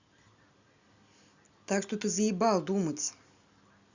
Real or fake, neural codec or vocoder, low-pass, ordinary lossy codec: real; none; 7.2 kHz; Opus, 64 kbps